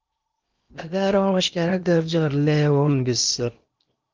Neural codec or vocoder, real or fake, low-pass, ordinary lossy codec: codec, 16 kHz in and 24 kHz out, 0.8 kbps, FocalCodec, streaming, 65536 codes; fake; 7.2 kHz; Opus, 24 kbps